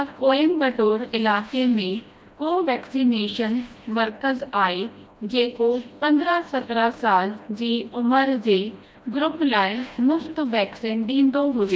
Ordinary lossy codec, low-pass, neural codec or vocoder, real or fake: none; none; codec, 16 kHz, 1 kbps, FreqCodec, smaller model; fake